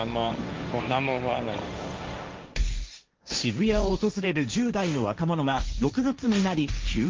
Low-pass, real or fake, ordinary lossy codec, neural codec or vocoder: 7.2 kHz; fake; Opus, 24 kbps; codec, 16 kHz, 1.1 kbps, Voila-Tokenizer